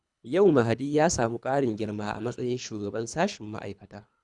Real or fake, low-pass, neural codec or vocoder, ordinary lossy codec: fake; 10.8 kHz; codec, 24 kHz, 3 kbps, HILCodec; none